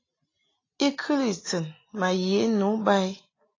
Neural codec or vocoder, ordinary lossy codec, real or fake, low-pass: none; AAC, 32 kbps; real; 7.2 kHz